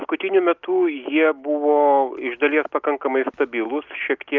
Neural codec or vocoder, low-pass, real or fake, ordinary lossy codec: none; 7.2 kHz; real; Opus, 32 kbps